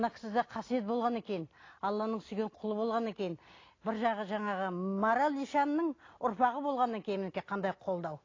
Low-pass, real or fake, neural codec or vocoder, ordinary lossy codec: 7.2 kHz; real; none; AAC, 32 kbps